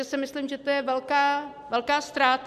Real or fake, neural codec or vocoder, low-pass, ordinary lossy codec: real; none; 14.4 kHz; MP3, 96 kbps